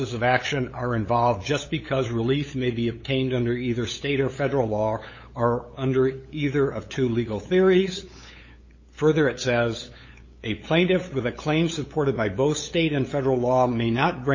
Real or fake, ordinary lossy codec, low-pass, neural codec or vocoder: fake; MP3, 32 kbps; 7.2 kHz; codec, 16 kHz, 8 kbps, FunCodec, trained on LibriTTS, 25 frames a second